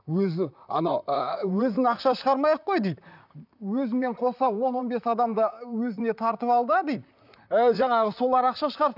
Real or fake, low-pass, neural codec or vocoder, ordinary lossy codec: fake; 5.4 kHz; vocoder, 44.1 kHz, 128 mel bands, Pupu-Vocoder; none